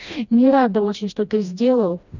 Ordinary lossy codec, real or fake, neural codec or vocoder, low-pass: none; fake; codec, 16 kHz, 1 kbps, FreqCodec, smaller model; 7.2 kHz